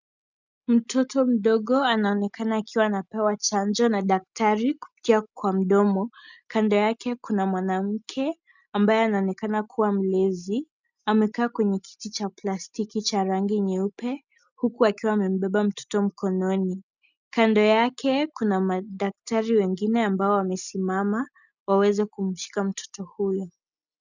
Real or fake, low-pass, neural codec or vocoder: real; 7.2 kHz; none